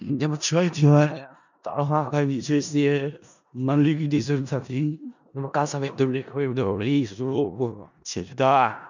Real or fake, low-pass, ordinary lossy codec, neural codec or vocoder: fake; 7.2 kHz; none; codec, 16 kHz in and 24 kHz out, 0.4 kbps, LongCat-Audio-Codec, four codebook decoder